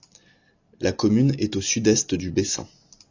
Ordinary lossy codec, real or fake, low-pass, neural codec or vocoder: AAC, 48 kbps; real; 7.2 kHz; none